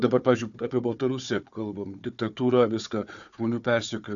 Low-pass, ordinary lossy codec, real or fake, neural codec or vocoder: 7.2 kHz; MP3, 96 kbps; fake; codec, 16 kHz, 4 kbps, FunCodec, trained on Chinese and English, 50 frames a second